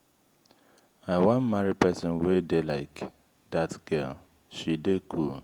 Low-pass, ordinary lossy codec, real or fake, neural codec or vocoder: 19.8 kHz; Opus, 64 kbps; fake; vocoder, 48 kHz, 128 mel bands, Vocos